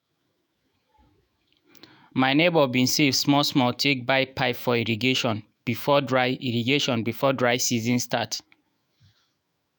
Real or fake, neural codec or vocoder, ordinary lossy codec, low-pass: fake; autoencoder, 48 kHz, 128 numbers a frame, DAC-VAE, trained on Japanese speech; none; none